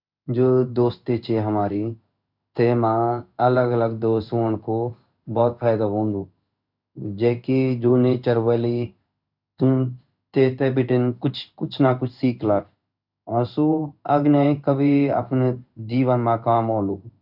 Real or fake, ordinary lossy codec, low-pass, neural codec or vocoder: fake; none; 5.4 kHz; codec, 16 kHz in and 24 kHz out, 1 kbps, XY-Tokenizer